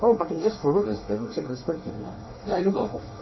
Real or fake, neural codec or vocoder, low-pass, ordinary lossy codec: fake; codec, 24 kHz, 1 kbps, SNAC; 7.2 kHz; MP3, 24 kbps